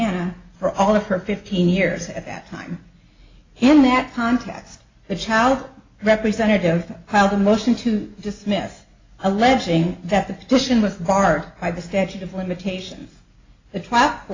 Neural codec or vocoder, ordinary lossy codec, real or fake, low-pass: none; AAC, 32 kbps; real; 7.2 kHz